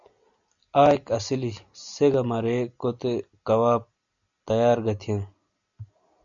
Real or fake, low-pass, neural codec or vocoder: real; 7.2 kHz; none